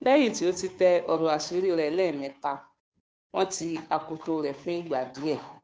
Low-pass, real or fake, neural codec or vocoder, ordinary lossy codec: none; fake; codec, 16 kHz, 2 kbps, FunCodec, trained on Chinese and English, 25 frames a second; none